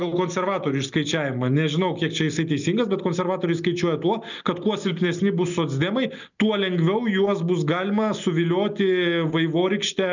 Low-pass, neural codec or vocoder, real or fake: 7.2 kHz; none; real